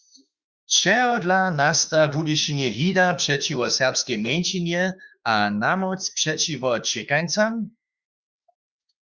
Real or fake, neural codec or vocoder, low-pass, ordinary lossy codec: fake; autoencoder, 48 kHz, 32 numbers a frame, DAC-VAE, trained on Japanese speech; 7.2 kHz; Opus, 64 kbps